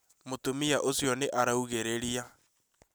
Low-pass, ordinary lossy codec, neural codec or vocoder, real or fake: none; none; none; real